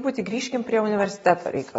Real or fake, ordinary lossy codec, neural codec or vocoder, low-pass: real; AAC, 24 kbps; none; 10.8 kHz